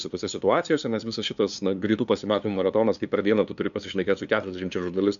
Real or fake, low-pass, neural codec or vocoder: fake; 7.2 kHz; codec, 16 kHz, 2 kbps, FunCodec, trained on LibriTTS, 25 frames a second